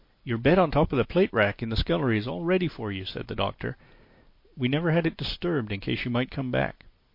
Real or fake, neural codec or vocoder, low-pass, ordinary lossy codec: real; none; 5.4 kHz; MP3, 32 kbps